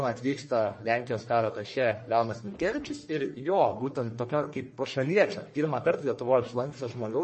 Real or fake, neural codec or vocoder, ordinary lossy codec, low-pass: fake; codec, 44.1 kHz, 1.7 kbps, Pupu-Codec; MP3, 32 kbps; 10.8 kHz